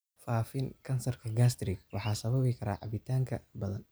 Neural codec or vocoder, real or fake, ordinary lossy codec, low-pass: none; real; none; none